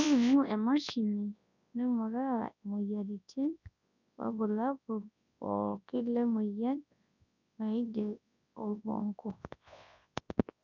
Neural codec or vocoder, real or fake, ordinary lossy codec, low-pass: codec, 24 kHz, 0.9 kbps, WavTokenizer, large speech release; fake; none; 7.2 kHz